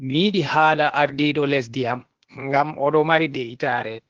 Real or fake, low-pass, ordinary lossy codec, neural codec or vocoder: fake; 7.2 kHz; Opus, 16 kbps; codec, 16 kHz, 0.8 kbps, ZipCodec